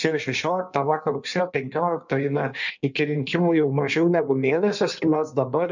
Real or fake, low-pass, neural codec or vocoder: fake; 7.2 kHz; codec, 16 kHz in and 24 kHz out, 1.1 kbps, FireRedTTS-2 codec